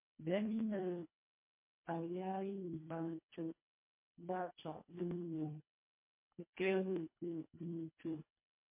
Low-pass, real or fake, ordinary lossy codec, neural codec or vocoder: 3.6 kHz; fake; MP3, 24 kbps; codec, 24 kHz, 1.5 kbps, HILCodec